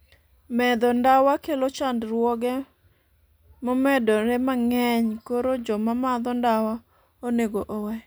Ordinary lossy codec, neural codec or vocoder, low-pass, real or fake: none; none; none; real